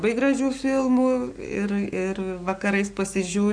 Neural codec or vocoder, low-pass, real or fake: codec, 44.1 kHz, 7.8 kbps, Pupu-Codec; 9.9 kHz; fake